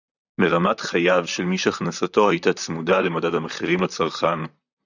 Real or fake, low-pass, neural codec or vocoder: fake; 7.2 kHz; vocoder, 44.1 kHz, 128 mel bands, Pupu-Vocoder